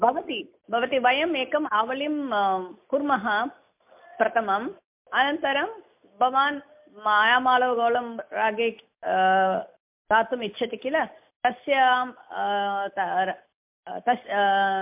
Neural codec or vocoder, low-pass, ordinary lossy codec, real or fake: none; 3.6 kHz; MP3, 32 kbps; real